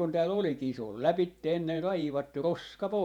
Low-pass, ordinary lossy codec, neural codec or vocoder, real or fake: 19.8 kHz; none; vocoder, 48 kHz, 128 mel bands, Vocos; fake